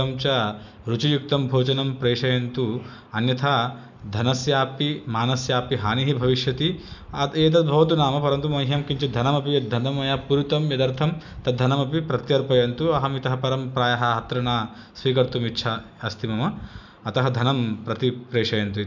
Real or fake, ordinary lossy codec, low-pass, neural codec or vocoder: real; none; 7.2 kHz; none